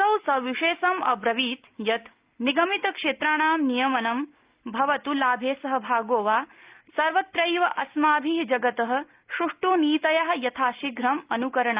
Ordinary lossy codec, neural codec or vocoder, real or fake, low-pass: Opus, 24 kbps; none; real; 3.6 kHz